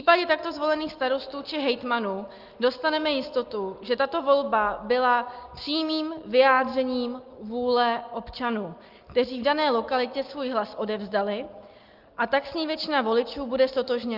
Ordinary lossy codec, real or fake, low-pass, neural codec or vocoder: Opus, 24 kbps; real; 5.4 kHz; none